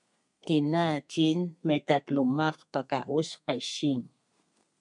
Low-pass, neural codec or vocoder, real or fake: 10.8 kHz; codec, 32 kHz, 1.9 kbps, SNAC; fake